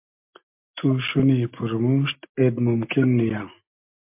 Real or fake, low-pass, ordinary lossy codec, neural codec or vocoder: real; 3.6 kHz; MP3, 32 kbps; none